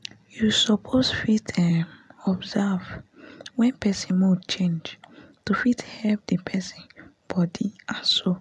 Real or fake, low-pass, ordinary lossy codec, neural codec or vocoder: real; none; none; none